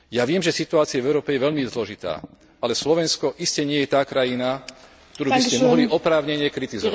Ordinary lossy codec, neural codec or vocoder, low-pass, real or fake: none; none; none; real